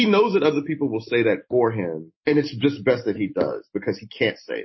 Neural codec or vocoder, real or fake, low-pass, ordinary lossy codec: none; real; 7.2 kHz; MP3, 24 kbps